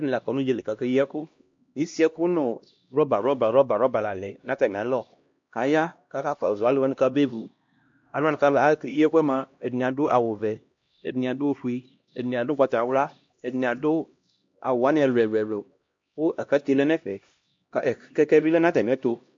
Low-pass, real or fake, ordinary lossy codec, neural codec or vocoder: 7.2 kHz; fake; MP3, 48 kbps; codec, 16 kHz, 1 kbps, X-Codec, HuBERT features, trained on LibriSpeech